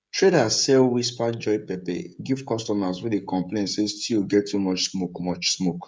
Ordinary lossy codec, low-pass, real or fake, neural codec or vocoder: none; none; fake; codec, 16 kHz, 16 kbps, FreqCodec, smaller model